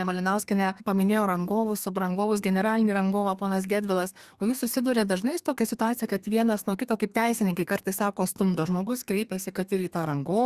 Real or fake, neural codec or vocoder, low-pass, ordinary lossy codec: fake; codec, 32 kHz, 1.9 kbps, SNAC; 14.4 kHz; Opus, 64 kbps